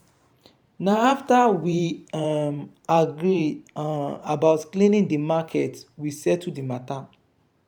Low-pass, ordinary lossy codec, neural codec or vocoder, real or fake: 19.8 kHz; none; vocoder, 44.1 kHz, 128 mel bands every 512 samples, BigVGAN v2; fake